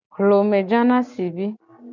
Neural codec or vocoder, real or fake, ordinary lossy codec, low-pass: none; real; MP3, 64 kbps; 7.2 kHz